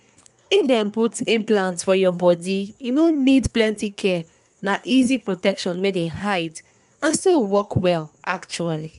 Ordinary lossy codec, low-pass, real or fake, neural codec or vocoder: none; 10.8 kHz; fake; codec, 24 kHz, 1 kbps, SNAC